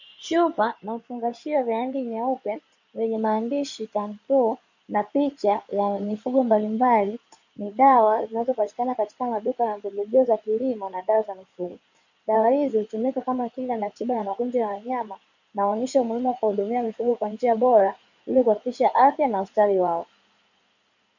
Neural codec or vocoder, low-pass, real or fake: codec, 16 kHz in and 24 kHz out, 2.2 kbps, FireRedTTS-2 codec; 7.2 kHz; fake